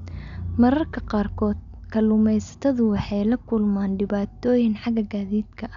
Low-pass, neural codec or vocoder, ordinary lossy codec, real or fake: 7.2 kHz; none; none; real